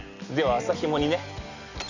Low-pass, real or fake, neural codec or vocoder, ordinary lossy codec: 7.2 kHz; real; none; none